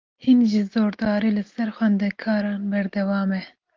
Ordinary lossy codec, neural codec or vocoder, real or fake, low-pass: Opus, 24 kbps; none; real; 7.2 kHz